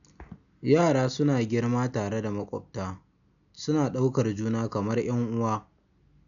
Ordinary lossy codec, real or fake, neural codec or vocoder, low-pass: none; real; none; 7.2 kHz